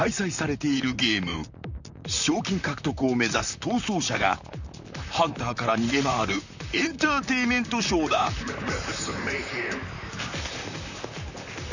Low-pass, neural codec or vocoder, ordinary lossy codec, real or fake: 7.2 kHz; vocoder, 44.1 kHz, 128 mel bands, Pupu-Vocoder; none; fake